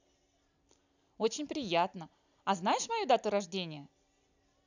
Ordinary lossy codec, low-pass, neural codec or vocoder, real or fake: none; 7.2 kHz; none; real